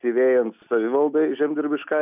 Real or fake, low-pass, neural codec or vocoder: real; 3.6 kHz; none